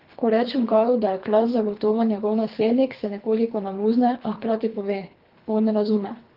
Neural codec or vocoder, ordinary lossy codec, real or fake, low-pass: codec, 24 kHz, 3 kbps, HILCodec; Opus, 32 kbps; fake; 5.4 kHz